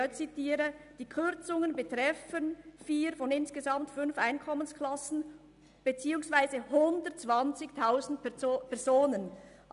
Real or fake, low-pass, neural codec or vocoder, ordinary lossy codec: real; 10.8 kHz; none; none